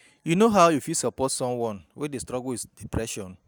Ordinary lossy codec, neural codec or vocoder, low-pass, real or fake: none; none; none; real